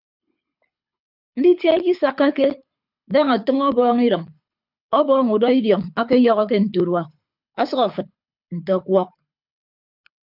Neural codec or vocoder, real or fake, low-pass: codec, 24 kHz, 6 kbps, HILCodec; fake; 5.4 kHz